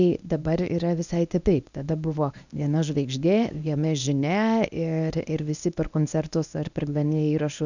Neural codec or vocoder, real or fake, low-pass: codec, 24 kHz, 0.9 kbps, WavTokenizer, medium speech release version 1; fake; 7.2 kHz